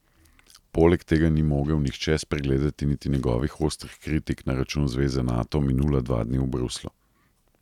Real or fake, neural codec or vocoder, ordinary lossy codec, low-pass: real; none; none; 19.8 kHz